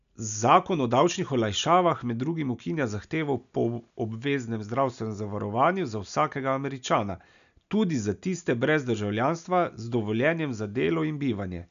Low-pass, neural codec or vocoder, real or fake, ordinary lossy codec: 7.2 kHz; none; real; none